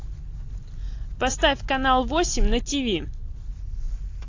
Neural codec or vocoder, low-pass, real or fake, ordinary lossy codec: none; 7.2 kHz; real; AAC, 48 kbps